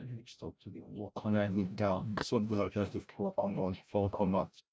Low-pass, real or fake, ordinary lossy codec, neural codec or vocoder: none; fake; none; codec, 16 kHz, 0.5 kbps, FreqCodec, larger model